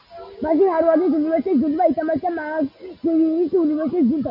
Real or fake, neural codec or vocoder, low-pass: real; none; 5.4 kHz